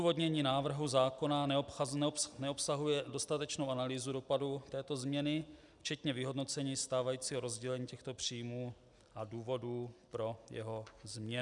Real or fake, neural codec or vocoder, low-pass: real; none; 10.8 kHz